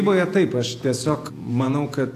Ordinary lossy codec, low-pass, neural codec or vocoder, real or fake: AAC, 64 kbps; 14.4 kHz; vocoder, 48 kHz, 128 mel bands, Vocos; fake